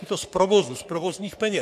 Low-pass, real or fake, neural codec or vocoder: 14.4 kHz; fake; codec, 44.1 kHz, 3.4 kbps, Pupu-Codec